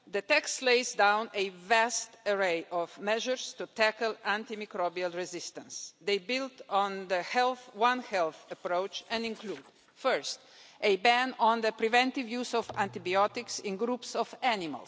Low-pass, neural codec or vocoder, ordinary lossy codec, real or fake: none; none; none; real